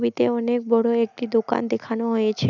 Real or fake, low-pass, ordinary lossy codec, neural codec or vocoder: real; 7.2 kHz; none; none